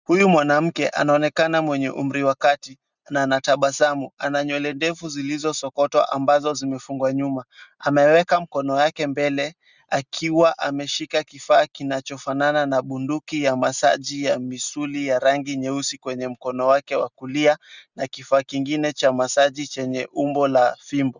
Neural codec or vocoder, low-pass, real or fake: none; 7.2 kHz; real